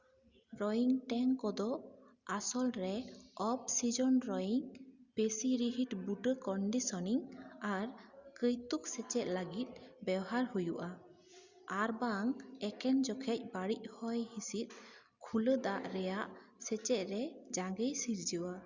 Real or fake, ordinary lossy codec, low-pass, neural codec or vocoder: real; Opus, 64 kbps; 7.2 kHz; none